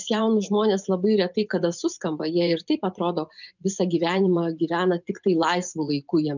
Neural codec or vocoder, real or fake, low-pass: vocoder, 24 kHz, 100 mel bands, Vocos; fake; 7.2 kHz